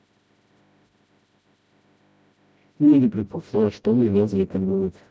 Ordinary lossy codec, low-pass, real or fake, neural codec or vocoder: none; none; fake; codec, 16 kHz, 0.5 kbps, FreqCodec, smaller model